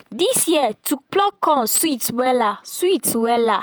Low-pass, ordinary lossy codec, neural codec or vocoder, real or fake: none; none; vocoder, 48 kHz, 128 mel bands, Vocos; fake